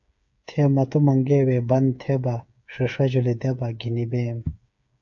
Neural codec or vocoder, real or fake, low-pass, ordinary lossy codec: codec, 16 kHz, 8 kbps, FreqCodec, smaller model; fake; 7.2 kHz; AAC, 64 kbps